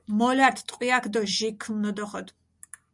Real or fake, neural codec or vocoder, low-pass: real; none; 10.8 kHz